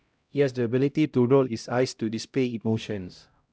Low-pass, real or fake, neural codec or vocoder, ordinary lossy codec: none; fake; codec, 16 kHz, 0.5 kbps, X-Codec, HuBERT features, trained on LibriSpeech; none